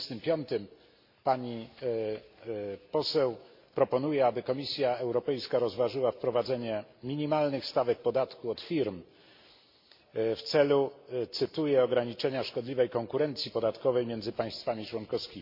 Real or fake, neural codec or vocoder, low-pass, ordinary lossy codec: real; none; 5.4 kHz; MP3, 32 kbps